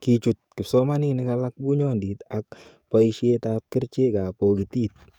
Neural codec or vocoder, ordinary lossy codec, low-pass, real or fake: codec, 44.1 kHz, 7.8 kbps, DAC; none; 19.8 kHz; fake